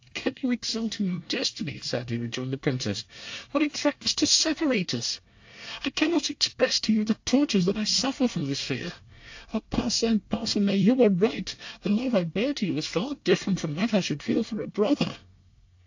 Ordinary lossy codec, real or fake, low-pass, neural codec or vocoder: AAC, 48 kbps; fake; 7.2 kHz; codec, 24 kHz, 1 kbps, SNAC